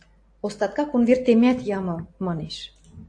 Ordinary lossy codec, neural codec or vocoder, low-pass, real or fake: AAC, 48 kbps; none; 9.9 kHz; real